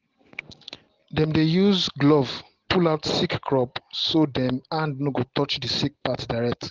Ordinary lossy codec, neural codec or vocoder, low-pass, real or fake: Opus, 16 kbps; none; 7.2 kHz; real